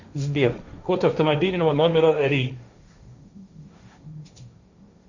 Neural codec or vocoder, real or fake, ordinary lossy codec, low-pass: codec, 16 kHz, 1.1 kbps, Voila-Tokenizer; fake; Opus, 64 kbps; 7.2 kHz